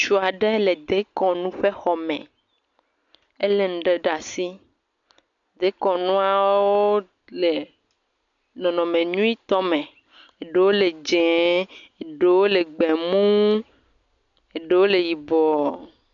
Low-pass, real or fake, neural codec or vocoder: 7.2 kHz; real; none